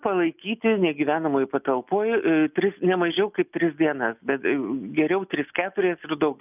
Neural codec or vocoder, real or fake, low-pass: none; real; 3.6 kHz